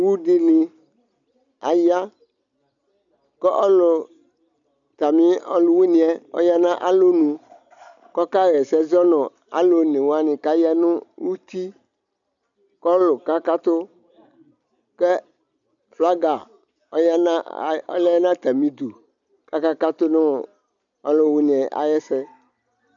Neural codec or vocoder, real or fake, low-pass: none; real; 7.2 kHz